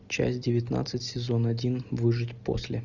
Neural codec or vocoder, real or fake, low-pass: none; real; 7.2 kHz